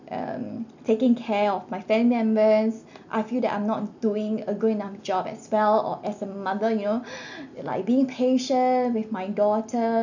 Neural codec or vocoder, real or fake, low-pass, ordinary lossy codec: none; real; 7.2 kHz; none